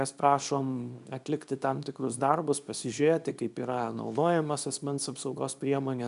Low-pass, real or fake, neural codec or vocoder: 10.8 kHz; fake; codec, 24 kHz, 0.9 kbps, WavTokenizer, small release